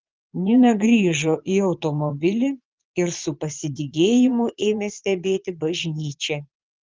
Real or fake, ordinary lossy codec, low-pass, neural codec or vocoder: fake; Opus, 32 kbps; 7.2 kHz; vocoder, 22.05 kHz, 80 mel bands, Vocos